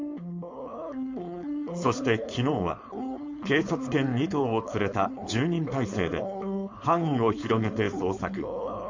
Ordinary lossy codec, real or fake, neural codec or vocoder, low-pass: MP3, 48 kbps; fake; codec, 16 kHz, 4.8 kbps, FACodec; 7.2 kHz